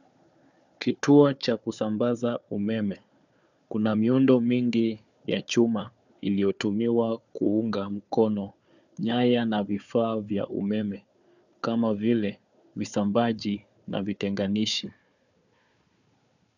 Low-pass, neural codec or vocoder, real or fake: 7.2 kHz; codec, 16 kHz, 4 kbps, FunCodec, trained on Chinese and English, 50 frames a second; fake